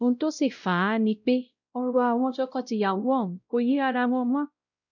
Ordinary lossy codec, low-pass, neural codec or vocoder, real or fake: none; 7.2 kHz; codec, 16 kHz, 0.5 kbps, X-Codec, WavLM features, trained on Multilingual LibriSpeech; fake